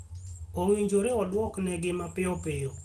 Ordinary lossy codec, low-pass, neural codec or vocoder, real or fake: Opus, 16 kbps; 14.4 kHz; none; real